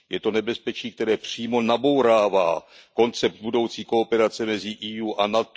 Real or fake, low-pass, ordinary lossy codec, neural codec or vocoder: real; none; none; none